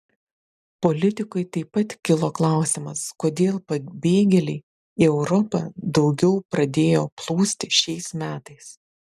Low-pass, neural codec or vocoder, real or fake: 14.4 kHz; none; real